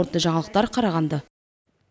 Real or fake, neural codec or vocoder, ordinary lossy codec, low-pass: real; none; none; none